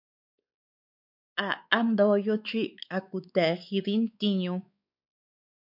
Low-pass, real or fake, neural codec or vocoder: 5.4 kHz; fake; codec, 16 kHz, 4 kbps, X-Codec, WavLM features, trained on Multilingual LibriSpeech